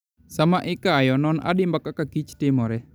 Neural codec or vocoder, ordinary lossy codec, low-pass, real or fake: none; none; none; real